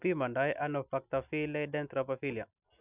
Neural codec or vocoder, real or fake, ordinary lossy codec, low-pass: none; real; none; 3.6 kHz